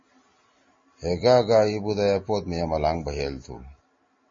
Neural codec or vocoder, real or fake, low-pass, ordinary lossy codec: none; real; 7.2 kHz; MP3, 32 kbps